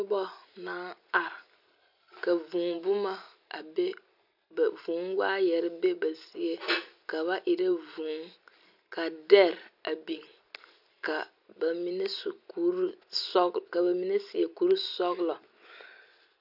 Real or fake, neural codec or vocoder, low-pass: real; none; 5.4 kHz